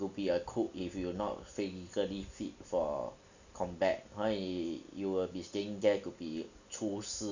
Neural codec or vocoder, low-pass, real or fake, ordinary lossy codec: none; 7.2 kHz; real; none